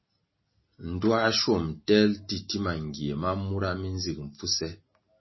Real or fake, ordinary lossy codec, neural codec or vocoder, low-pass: real; MP3, 24 kbps; none; 7.2 kHz